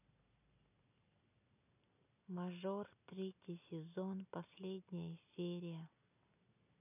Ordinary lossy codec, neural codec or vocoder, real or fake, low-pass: none; none; real; 3.6 kHz